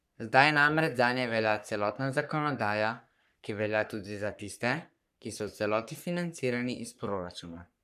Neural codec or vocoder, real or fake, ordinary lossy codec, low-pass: codec, 44.1 kHz, 3.4 kbps, Pupu-Codec; fake; none; 14.4 kHz